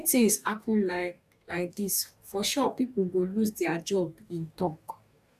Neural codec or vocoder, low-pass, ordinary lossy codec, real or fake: codec, 44.1 kHz, 2.6 kbps, DAC; 14.4 kHz; none; fake